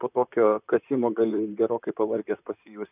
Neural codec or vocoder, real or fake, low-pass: vocoder, 44.1 kHz, 128 mel bands, Pupu-Vocoder; fake; 3.6 kHz